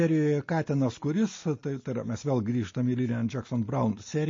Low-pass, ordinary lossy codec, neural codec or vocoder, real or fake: 7.2 kHz; MP3, 32 kbps; none; real